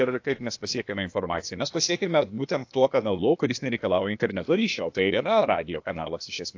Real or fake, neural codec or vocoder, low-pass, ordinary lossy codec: fake; codec, 16 kHz, 0.8 kbps, ZipCodec; 7.2 kHz; AAC, 48 kbps